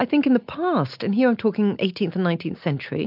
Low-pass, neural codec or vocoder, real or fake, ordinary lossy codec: 5.4 kHz; none; real; MP3, 48 kbps